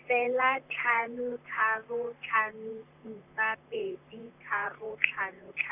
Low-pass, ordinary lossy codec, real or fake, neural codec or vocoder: 3.6 kHz; none; fake; vocoder, 44.1 kHz, 128 mel bands, Pupu-Vocoder